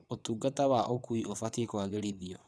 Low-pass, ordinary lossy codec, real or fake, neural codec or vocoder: none; none; fake; vocoder, 22.05 kHz, 80 mel bands, WaveNeXt